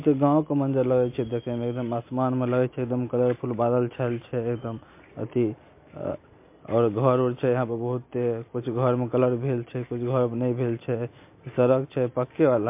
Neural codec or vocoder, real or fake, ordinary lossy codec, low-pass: none; real; MP3, 24 kbps; 3.6 kHz